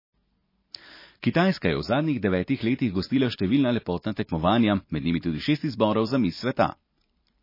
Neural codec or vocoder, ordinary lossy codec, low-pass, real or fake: none; MP3, 24 kbps; 5.4 kHz; real